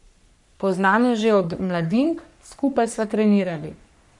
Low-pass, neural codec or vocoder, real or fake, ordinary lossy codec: 10.8 kHz; codec, 44.1 kHz, 3.4 kbps, Pupu-Codec; fake; none